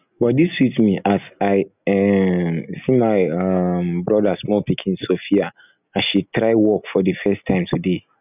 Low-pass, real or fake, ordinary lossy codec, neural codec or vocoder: 3.6 kHz; real; none; none